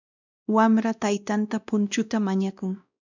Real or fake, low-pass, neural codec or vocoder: fake; 7.2 kHz; codec, 16 kHz, 1 kbps, X-Codec, WavLM features, trained on Multilingual LibriSpeech